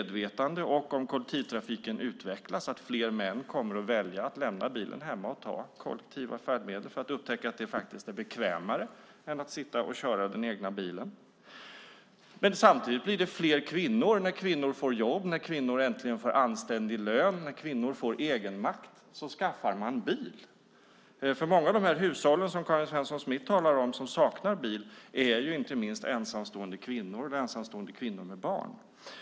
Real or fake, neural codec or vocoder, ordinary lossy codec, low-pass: real; none; none; none